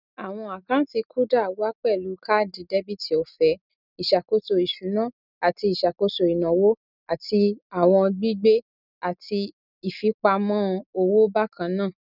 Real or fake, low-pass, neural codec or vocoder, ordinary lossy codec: real; 5.4 kHz; none; none